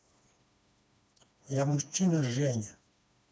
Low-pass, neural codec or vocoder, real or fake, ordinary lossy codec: none; codec, 16 kHz, 2 kbps, FreqCodec, smaller model; fake; none